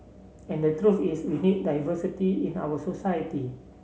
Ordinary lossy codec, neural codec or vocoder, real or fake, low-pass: none; none; real; none